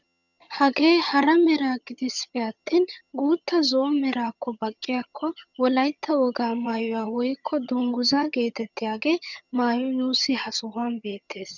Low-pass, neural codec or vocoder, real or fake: 7.2 kHz; vocoder, 22.05 kHz, 80 mel bands, HiFi-GAN; fake